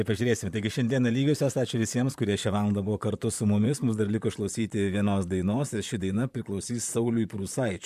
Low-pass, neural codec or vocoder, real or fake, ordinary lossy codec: 14.4 kHz; vocoder, 44.1 kHz, 128 mel bands, Pupu-Vocoder; fake; MP3, 96 kbps